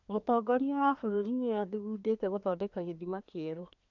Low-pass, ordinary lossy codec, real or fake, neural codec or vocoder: 7.2 kHz; none; fake; codec, 24 kHz, 1 kbps, SNAC